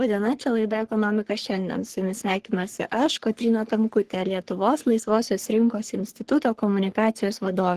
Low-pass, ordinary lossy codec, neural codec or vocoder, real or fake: 14.4 kHz; Opus, 16 kbps; codec, 44.1 kHz, 3.4 kbps, Pupu-Codec; fake